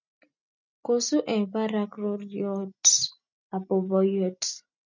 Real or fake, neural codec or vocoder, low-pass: real; none; 7.2 kHz